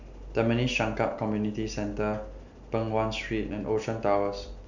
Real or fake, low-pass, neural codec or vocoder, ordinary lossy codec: real; 7.2 kHz; none; none